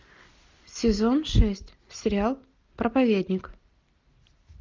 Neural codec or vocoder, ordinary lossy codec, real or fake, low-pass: none; Opus, 32 kbps; real; 7.2 kHz